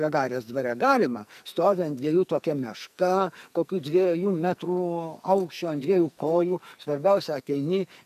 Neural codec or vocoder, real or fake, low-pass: codec, 44.1 kHz, 2.6 kbps, SNAC; fake; 14.4 kHz